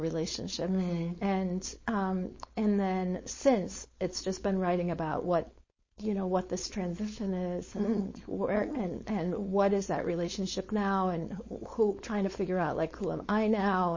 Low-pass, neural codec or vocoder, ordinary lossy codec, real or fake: 7.2 kHz; codec, 16 kHz, 4.8 kbps, FACodec; MP3, 32 kbps; fake